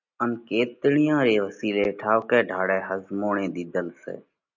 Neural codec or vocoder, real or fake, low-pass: none; real; 7.2 kHz